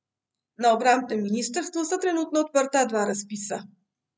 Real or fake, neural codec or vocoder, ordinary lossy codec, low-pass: real; none; none; none